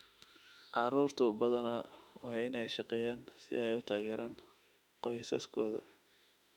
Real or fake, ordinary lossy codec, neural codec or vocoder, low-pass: fake; none; autoencoder, 48 kHz, 32 numbers a frame, DAC-VAE, trained on Japanese speech; 19.8 kHz